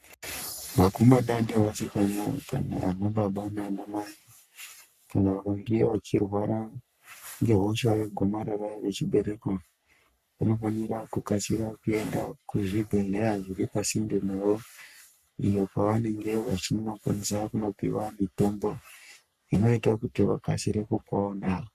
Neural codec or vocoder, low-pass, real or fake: codec, 44.1 kHz, 3.4 kbps, Pupu-Codec; 14.4 kHz; fake